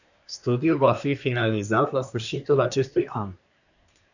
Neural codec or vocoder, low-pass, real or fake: codec, 24 kHz, 1 kbps, SNAC; 7.2 kHz; fake